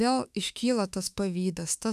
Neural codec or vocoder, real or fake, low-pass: autoencoder, 48 kHz, 32 numbers a frame, DAC-VAE, trained on Japanese speech; fake; 14.4 kHz